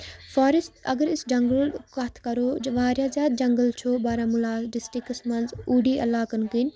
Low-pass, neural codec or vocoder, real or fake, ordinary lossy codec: none; none; real; none